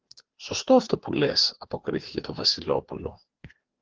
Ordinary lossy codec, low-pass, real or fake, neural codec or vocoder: Opus, 16 kbps; 7.2 kHz; fake; codec, 16 kHz, 2 kbps, FreqCodec, larger model